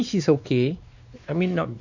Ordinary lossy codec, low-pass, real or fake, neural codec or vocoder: none; 7.2 kHz; fake; codec, 16 kHz, 2 kbps, X-Codec, WavLM features, trained on Multilingual LibriSpeech